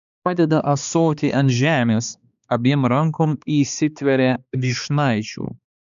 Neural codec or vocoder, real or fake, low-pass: codec, 16 kHz, 2 kbps, X-Codec, HuBERT features, trained on balanced general audio; fake; 7.2 kHz